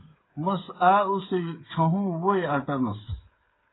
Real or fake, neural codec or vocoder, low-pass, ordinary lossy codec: fake; codec, 16 kHz, 8 kbps, FreqCodec, smaller model; 7.2 kHz; AAC, 16 kbps